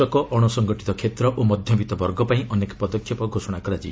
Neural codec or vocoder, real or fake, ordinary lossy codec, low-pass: none; real; none; none